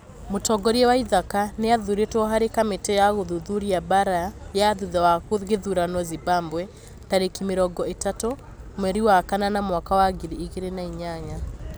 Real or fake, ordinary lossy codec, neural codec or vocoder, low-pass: real; none; none; none